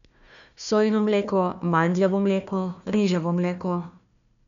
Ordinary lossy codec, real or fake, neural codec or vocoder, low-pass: none; fake; codec, 16 kHz, 1 kbps, FunCodec, trained on Chinese and English, 50 frames a second; 7.2 kHz